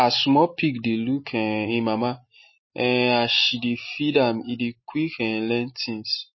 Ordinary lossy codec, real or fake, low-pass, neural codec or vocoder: MP3, 24 kbps; real; 7.2 kHz; none